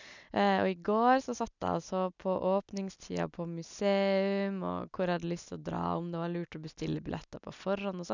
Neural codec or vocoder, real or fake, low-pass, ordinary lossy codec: none; real; 7.2 kHz; none